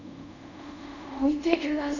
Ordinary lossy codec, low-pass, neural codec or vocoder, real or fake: none; 7.2 kHz; codec, 24 kHz, 0.5 kbps, DualCodec; fake